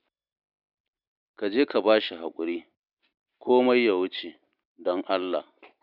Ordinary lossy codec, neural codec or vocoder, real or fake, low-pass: none; none; real; 5.4 kHz